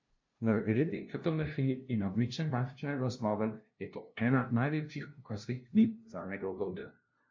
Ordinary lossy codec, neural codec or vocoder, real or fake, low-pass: MP3, 48 kbps; codec, 16 kHz, 0.5 kbps, FunCodec, trained on LibriTTS, 25 frames a second; fake; 7.2 kHz